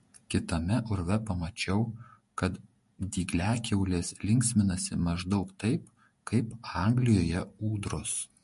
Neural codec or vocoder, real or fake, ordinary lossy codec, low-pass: vocoder, 48 kHz, 128 mel bands, Vocos; fake; MP3, 48 kbps; 14.4 kHz